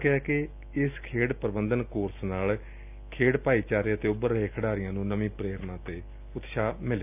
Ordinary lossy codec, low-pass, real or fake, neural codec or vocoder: none; 3.6 kHz; real; none